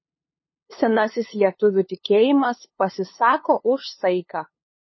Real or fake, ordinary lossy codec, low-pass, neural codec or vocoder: fake; MP3, 24 kbps; 7.2 kHz; codec, 16 kHz, 2 kbps, FunCodec, trained on LibriTTS, 25 frames a second